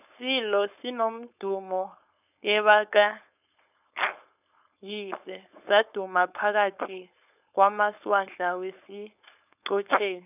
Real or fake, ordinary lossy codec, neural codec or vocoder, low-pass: fake; none; codec, 16 kHz, 4.8 kbps, FACodec; 3.6 kHz